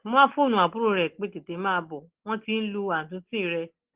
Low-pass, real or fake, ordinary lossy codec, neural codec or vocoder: 3.6 kHz; real; Opus, 16 kbps; none